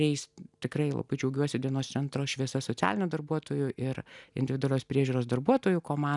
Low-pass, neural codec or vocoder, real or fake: 10.8 kHz; none; real